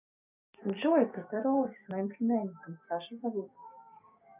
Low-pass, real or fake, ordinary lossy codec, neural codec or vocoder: 3.6 kHz; fake; none; codec, 16 kHz, 6 kbps, DAC